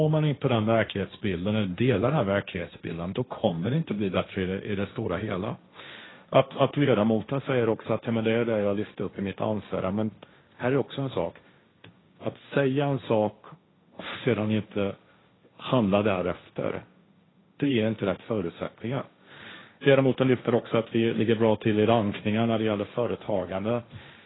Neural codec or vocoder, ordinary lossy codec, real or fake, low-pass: codec, 16 kHz, 1.1 kbps, Voila-Tokenizer; AAC, 16 kbps; fake; 7.2 kHz